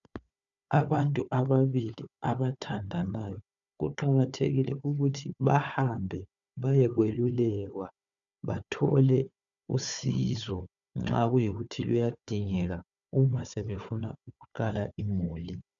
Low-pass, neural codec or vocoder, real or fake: 7.2 kHz; codec, 16 kHz, 4 kbps, FunCodec, trained on Chinese and English, 50 frames a second; fake